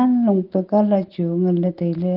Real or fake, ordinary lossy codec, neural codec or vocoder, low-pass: real; Opus, 16 kbps; none; 5.4 kHz